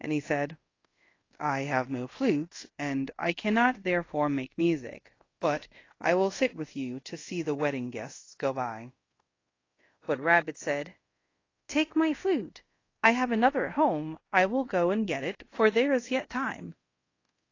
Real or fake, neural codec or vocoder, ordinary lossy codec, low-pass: fake; codec, 24 kHz, 0.5 kbps, DualCodec; AAC, 32 kbps; 7.2 kHz